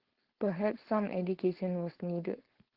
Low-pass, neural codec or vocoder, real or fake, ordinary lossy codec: 5.4 kHz; codec, 16 kHz, 4.8 kbps, FACodec; fake; Opus, 16 kbps